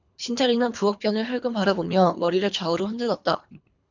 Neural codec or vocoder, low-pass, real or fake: codec, 24 kHz, 3 kbps, HILCodec; 7.2 kHz; fake